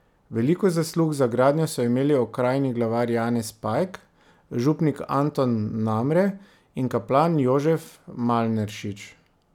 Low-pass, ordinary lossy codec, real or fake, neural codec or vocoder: 19.8 kHz; none; real; none